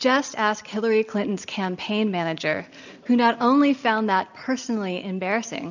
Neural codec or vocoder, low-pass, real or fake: none; 7.2 kHz; real